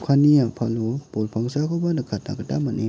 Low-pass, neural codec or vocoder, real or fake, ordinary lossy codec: none; none; real; none